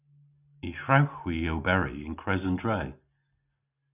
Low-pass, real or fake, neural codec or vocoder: 3.6 kHz; real; none